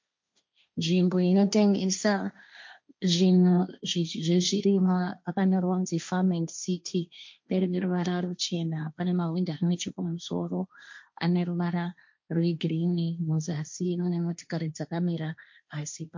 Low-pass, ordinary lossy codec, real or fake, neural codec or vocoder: 7.2 kHz; MP3, 48 kbps; fake; codec, 16 kHz, 1.1 kbps, Voila-Tokenizer